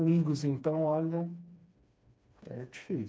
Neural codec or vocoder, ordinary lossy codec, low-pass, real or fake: codec, 16 kHz, 2 kbps, FreqCodec, smaller model; none; none; fake